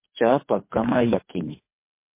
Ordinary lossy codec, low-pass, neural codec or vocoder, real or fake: MP3, 24 kbps; 3.6 kHz; codec, 24 kHz, 3 kbps, HILCodec; fake